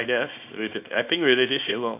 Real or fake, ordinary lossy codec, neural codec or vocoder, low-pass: fake; AAC, 32 kbps; codec, 24 kHz, 0.9 kbps, WavTokenizer, small release; 3.6 kHz